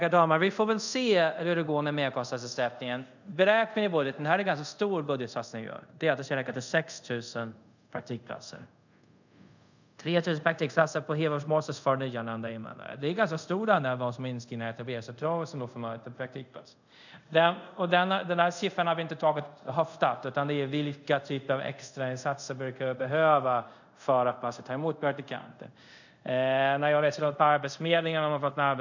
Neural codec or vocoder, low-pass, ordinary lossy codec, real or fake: codec, 24 kHz, 0.5 kbps, DualCodec; 7.2 kHz; none; fake